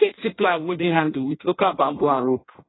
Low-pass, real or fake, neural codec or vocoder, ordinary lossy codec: 7.2 kHz; fake; codec, 16 kHz in and 24 kHz out, 0.6 kbps, FireRedTTS-2 codec; AAC, 16 kbps